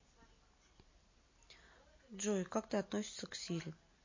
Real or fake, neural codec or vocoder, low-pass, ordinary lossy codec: real; none; 7.2 kHz; MP3, 32 kbps